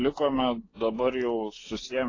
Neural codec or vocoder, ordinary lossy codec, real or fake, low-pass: none; AAC, 32 kbps; real; 7.2 kHz